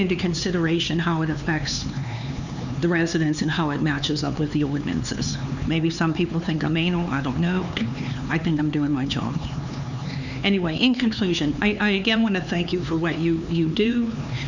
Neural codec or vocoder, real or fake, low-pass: codec, 16 kHz, 4 kbps, X-Codec, HuBERT features, trained on LibriSpeech; fake; 7.2 kHz